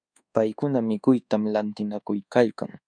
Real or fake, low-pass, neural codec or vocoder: fake; 9.9 kHz; codec, 24 kHz, 1.2 kbps, DualCodec